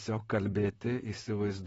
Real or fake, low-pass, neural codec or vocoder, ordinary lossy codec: fake; 19.8 kHz; vocoder, 44.1 kHz, 128 mel bands, Pupu-Vocoder; AAC, 24 kbps